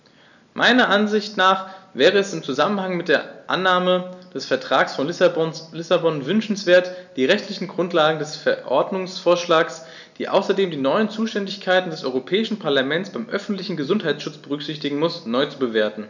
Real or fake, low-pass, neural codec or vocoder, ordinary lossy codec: real; 7.2 kHz; none; none